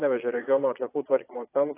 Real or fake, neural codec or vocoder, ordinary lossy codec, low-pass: fake; vocoder, 22.05 kHz, 80 mel bands, Vocos; AAC, 16 kbps; 3.6 kHz